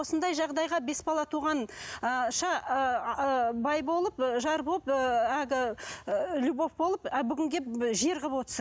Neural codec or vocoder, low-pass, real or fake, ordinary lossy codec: none; none; real; none